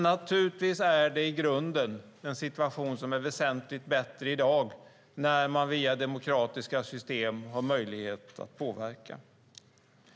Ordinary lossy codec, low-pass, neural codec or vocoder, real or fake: none; none; none; real